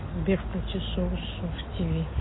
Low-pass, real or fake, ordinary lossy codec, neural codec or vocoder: 7.2 kHz; real; AAC, 16 kbps; none